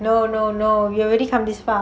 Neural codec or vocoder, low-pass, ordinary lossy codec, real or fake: none; none; none; real